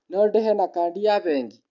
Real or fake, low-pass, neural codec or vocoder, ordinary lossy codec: real; 7.2 kHz; none; none